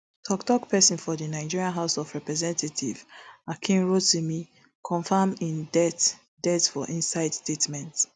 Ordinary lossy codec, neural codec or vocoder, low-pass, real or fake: none; none; none; real